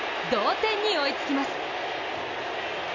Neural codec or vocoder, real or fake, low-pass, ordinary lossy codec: none; real; 7.2 kHz; none